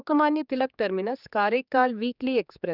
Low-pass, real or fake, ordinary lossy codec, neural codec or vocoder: 5.4 kHz; fake; none; codec, 16 kHz, 4 kbps, X-Codec, HuBERT features, trained on general audio